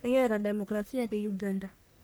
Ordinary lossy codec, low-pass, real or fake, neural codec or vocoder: none; none; fake; codec, 44.1 kHz, 1.7 kbps, Pupu-Codec